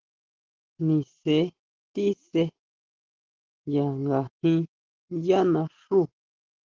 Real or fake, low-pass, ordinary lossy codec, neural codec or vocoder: real; 7.2 kHz; Opus, 16 kbps; none